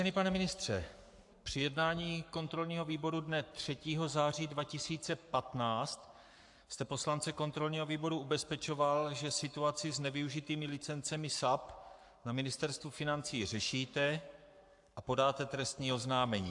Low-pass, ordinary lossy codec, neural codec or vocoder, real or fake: 10.8 kHz; AAC, 64 kbps; codec, 44.1 kHz, 7.8 kbps, Pupu-Codec; fake